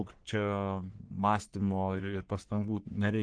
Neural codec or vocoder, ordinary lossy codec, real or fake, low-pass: codec, 44.1 kHz, 3.4 kbps, Pupu-Codec; Opus, 16 kbps; fake; 9.9 kHz